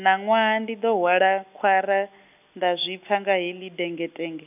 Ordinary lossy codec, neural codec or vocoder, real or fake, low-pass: none; none; real; 3.6 kHz